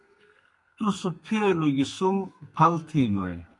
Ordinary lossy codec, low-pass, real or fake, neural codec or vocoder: MP3, 64 kbps; 10.8 kHz; fake; codec, 32 kHz, 1.9 kbps, SNAC